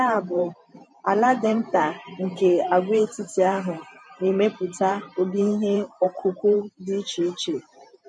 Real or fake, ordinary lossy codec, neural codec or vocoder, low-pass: fake; MP3, 48 kbps; vocoder, 44.1 kHz, 128 mel bands every 512 samples, BigVGAN v2; 10.8 kHz